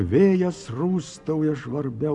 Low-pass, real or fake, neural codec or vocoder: 10.8 kHz; real; none